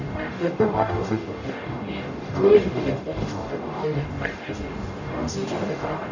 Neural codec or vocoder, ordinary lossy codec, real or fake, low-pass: codec, 44.1 kHz, 0.9 kbps, DAC; none; fake; 7.2 kHz